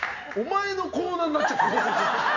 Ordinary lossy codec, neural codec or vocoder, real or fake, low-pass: none; none; real; 7.2 kHz